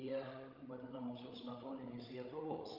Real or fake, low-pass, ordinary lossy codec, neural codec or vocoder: fake; 5.4 kHz; Opus, 24 kbps; codec, 16 kHz, 16 kbps, FreqCodec, larger model